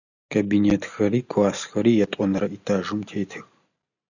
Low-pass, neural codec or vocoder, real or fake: 7.2 kHz; none; real